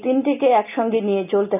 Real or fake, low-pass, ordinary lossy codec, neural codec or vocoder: real; 3.6 kHz; none; none